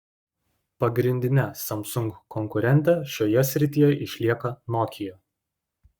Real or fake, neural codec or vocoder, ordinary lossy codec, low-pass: fake; codec, 44.1 kHz, 7.8 kbps, Pupu-Codec; Opus, 64 kbps; 19.8 kHz